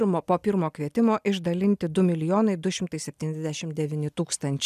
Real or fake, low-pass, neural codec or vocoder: real; 14.4 kHz; none